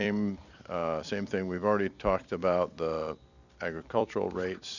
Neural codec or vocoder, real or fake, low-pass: vocoder, 44.1 kHz, 128 mel bands every 256 samples, BigVGAN v2; fake; 7.2 kHz